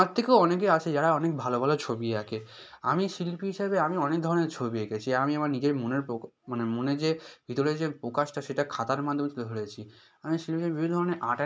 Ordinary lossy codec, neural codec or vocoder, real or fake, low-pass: none; none; real; none